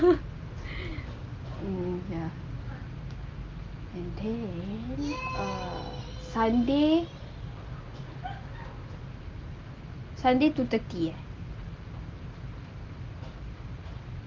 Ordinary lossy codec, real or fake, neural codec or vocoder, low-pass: Opus, 24 kbps; real; none; 7.2 kHz